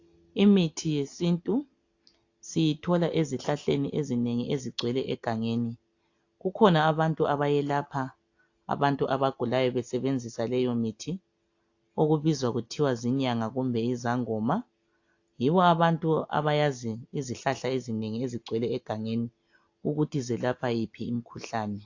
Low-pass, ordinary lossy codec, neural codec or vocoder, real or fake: 7.2 kHz; AAC, 48 kbps; none; real